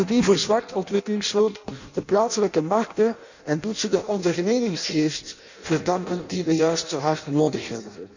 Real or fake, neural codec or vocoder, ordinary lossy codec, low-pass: fake; codec, 16 kHz in and 24 kHz out, 0.6 kbps, FireRedTTS-2 codec; none; 7.2 kHz